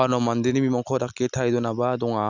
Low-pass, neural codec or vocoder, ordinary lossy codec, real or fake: 7.2 kHz; none; none; real